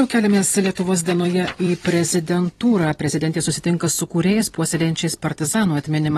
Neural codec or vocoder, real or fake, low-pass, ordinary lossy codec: vocoder, 44.1 kHz, 128 mel bands every 256 samples, BigVGAN v2; fake; 19.8 kHz; AAC, 32 kbps